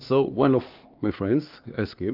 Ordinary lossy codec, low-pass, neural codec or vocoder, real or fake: Opus, 32 kbps; 5.4 kHz; codec, 16 kHz, 2 kbps, X-Codec, HuBERT features, trained on LibriSpeech; fake